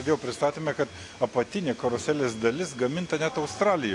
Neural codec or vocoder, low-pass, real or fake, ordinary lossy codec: none; 10.8 kHz; real; AAC, 48 kbps